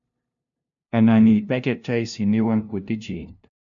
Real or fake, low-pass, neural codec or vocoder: fake; 7.2 kHz; codec, 16 kHz, 0.5 kbps, FunCodec, trained on LibriTTS, 25 frames a second